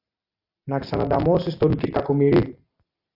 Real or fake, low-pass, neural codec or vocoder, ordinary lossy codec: real; 5.4 kHz; none; AAC, 48 kbps